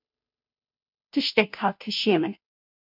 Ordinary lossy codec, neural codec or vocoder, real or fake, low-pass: MP3, 48 kbps; codec, 16 kHz, 0.5 kbps, FunCodec, trained on Chinese and English, 25 frames a second; fake; 5.4 kHz